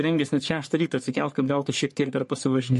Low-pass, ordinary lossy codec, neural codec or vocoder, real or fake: 14.4 kHz; MP3, 48 kbps; codec, 44.1 kHz, 3.4 kbps, Pupu-Codec; fake